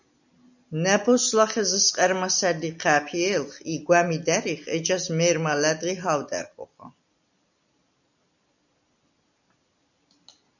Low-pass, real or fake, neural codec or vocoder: 7.2 kHz; real; none